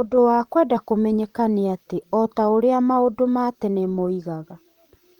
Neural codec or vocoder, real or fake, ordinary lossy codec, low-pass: vocoder, 44.1 kHz, 128 mel bands, Pupu-Vocoder; fake; Opus, 24 kbps; 19.8 kHz